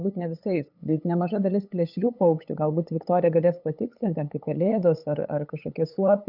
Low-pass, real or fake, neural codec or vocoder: 5.4 kHz; fake; codec, 16 kHz, 8 kbps, FunCodec, trained on LibriTTS, 25 frames a second